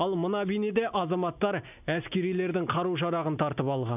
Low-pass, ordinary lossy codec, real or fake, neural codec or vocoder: 3.6 kHz; none; real; none